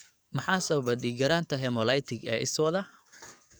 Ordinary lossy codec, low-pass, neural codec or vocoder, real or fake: none; none; codec, 44.1 kHz, 7.8 kbps, DAC; fake